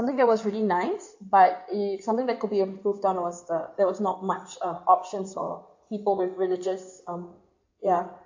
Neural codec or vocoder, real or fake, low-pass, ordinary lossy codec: codec, 16 kHz in and 24 kHz out, 2.2 kbps, FireRedTTS-2 codec; fake; 7.2 kHz; AAC, 48 kbps